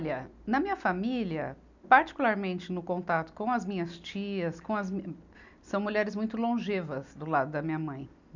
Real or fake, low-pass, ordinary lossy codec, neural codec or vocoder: real; 7.2 kHz; none; none